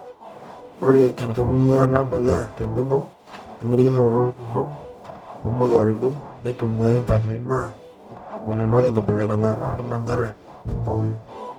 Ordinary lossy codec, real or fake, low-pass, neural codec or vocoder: none; fake; 19.8 kHz; codec, 44.1 kHz, 0.9 kbps, DAC